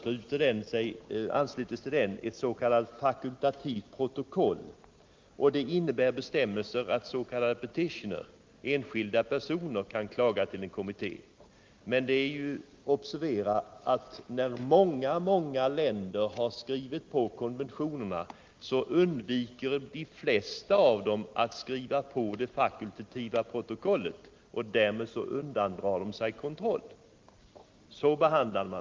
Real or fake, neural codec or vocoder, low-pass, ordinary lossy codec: real; none; 7.2 kHz; Opus, 32 kbps